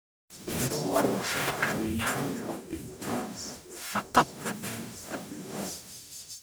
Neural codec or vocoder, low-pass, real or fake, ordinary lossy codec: codec, 44.1 kHz, 0.9 kbps, DAC; none; fake; none